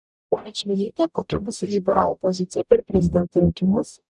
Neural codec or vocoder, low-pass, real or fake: codec, 44.1 kHz, 0.9 kbps, DAC; 10.8 kHz; fake